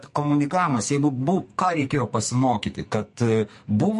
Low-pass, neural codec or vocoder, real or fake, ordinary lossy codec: 14.4 kHz; codec, 44.1 kHz, 2.6 kbps, SNAC; fake; MP3, 48 kbps